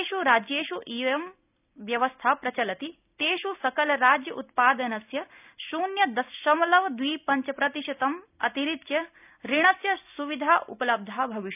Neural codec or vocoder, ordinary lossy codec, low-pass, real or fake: none; none; 3.6 kHz; real